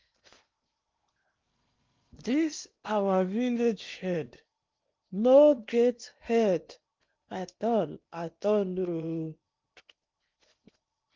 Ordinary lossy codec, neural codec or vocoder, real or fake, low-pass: Opus, 32 kbps; codec, 16 kHz in and 24 kHz out, 0.8 kbps, FocalCodec, streaming, 65536 codes; fake; 7.2 kHz